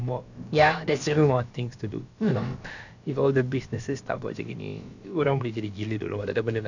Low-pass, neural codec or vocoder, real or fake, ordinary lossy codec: 7.2 kHz; codec, 16 kHz, about 1 kbps, DyCAST, with the encoder's durations; fake; none